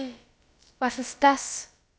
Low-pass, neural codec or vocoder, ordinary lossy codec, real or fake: none; codec, 16 kHz, about 1 kbps, DyCAST, with the encoder's durations; none; fake